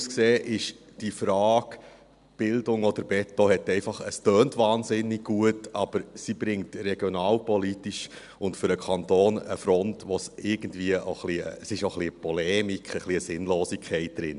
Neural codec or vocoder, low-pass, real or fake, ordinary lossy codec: none; 10.8 kHz; real; none